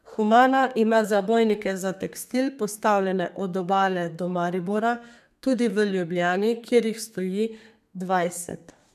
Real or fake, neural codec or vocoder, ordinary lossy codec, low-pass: fake; codec, 32 kHz, 1.9 kbps, SNAC; none; 14.4 kHz